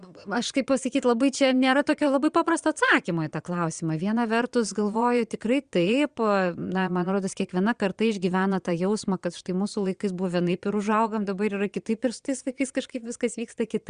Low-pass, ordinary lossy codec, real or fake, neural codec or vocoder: 9.9 kHz; Opus, 64 kbps; fake; vocoder, 22.05 kHz, 80 mel bands, Vocos